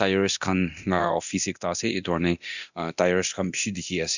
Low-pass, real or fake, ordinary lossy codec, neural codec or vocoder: 7.2 kHz; fake; none; codec, 24 kHz, 0.9 kbps, DualCodec